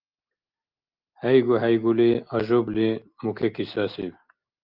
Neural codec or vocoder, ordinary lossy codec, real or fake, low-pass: none; Opus, 32 kbps; real; 5.4 kHz